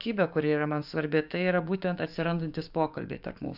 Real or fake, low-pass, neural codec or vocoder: fake; 5.4 kHz; codec, 16 kHz, 6 kbps, DAC